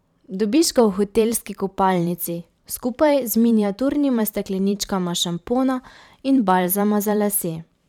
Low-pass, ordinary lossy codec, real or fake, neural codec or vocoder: 19.8 kHz; none; fake; vocoder, 44.1 kHz, 128 mel bands every 512 samples, BigVGAN v2